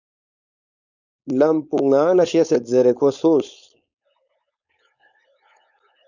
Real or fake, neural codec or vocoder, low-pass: fake; codec, 16 kHz, 4.8 kbps, FACodec; 7.2 kHz